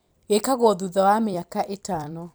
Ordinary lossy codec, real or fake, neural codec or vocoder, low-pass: none; fake; vocoder, 44.1 kHz, 128 mel bands every 256 samples, BigVGAN v2; none